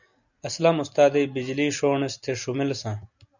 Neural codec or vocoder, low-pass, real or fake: none; 7.2 kHz; real